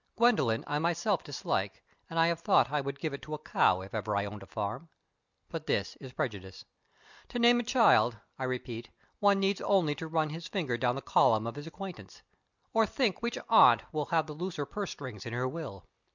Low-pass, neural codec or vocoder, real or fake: 7.2 kHz; none; real